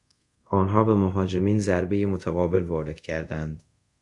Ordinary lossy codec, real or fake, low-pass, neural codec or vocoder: AAC, 64 kbps; fake; 10.8 kHz; codec, 24 kHz, 0.5 kbps, DualCodec